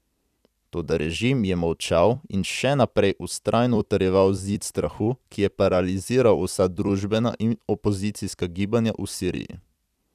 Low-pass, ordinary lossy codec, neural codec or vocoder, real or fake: 14.4 kHz; none; vocoder, 44.1 kHz, 128 mel bands every 256 samples, BigVGAN v2; fake